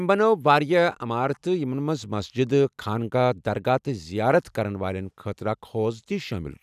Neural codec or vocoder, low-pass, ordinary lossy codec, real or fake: none; 14.4 kHz; none; real